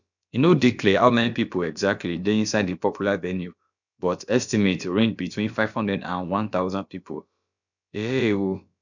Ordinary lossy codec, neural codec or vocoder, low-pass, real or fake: none; codec, 16 kHz, about 1 kbps, DyCAST, with the encoder's durations; 7.2 kHz; fake